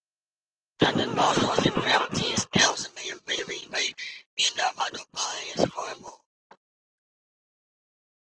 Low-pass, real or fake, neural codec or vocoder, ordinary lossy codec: 9.9 kHz; real; none; Opus, 16 kbps